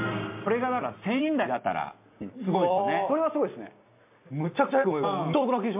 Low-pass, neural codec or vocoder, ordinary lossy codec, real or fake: 3.6 kHz; none; MP3, 32 kbps; real